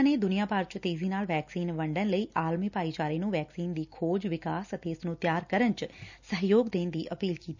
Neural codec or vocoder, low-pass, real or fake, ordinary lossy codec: none; 7.2 kHz; real; none